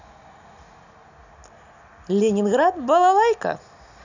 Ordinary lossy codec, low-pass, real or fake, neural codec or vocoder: none; 7.2 kHz; real; none